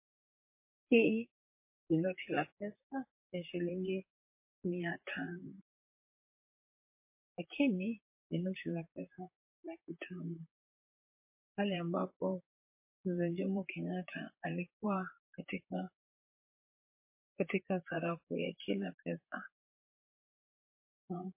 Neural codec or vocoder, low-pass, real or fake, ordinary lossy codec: vocoder, 44.1 kHz, 128 mel bands, Pupu-Vocoder; 3.6 kHz; fake; MP3, 24 kbps